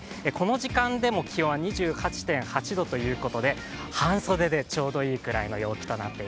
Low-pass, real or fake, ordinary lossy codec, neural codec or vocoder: none; real; none; none